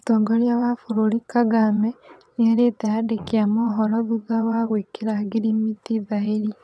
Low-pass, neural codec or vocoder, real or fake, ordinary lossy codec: none; vocoder, 22.05 kHz, 80 mel bands, WaveNeXt; fake; none